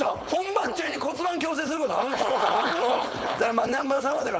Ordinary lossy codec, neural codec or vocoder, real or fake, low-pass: none; codec, 16 kHz, 4.8 kbps, FACodec; fake; none